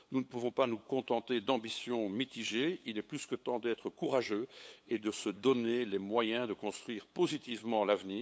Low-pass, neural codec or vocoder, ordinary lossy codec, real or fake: none; codec, 16 kHz, 8 kbps, FunCodec, trained on LibriTTS, 25 frames a second; none; fake